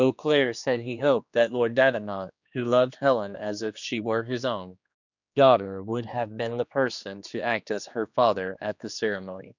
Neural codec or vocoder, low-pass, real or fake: codec, 16 kHz, 2 kbps, X-Codec, HuBERT features, trained on general audio; 7.2 kHz; fake